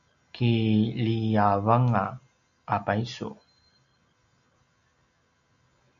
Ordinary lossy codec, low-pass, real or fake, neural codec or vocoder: AAC, 64 kbps; 7.2 kHz; real; none